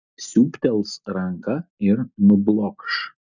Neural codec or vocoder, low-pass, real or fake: none; 7.2 kHz; real